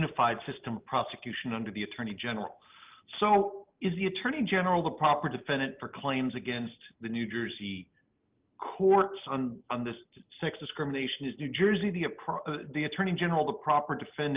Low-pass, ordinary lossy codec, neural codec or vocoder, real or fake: 3.6 kHz; Opus, 24 kbps; none; real